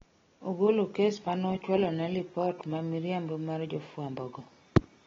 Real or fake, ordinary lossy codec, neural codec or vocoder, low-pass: real; AAC, 24 kbps; none; 7.2 kHz